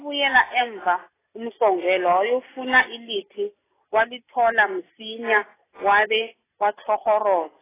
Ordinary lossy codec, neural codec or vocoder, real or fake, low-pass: AAC, 16 kbps; none; real; 3.6 kHz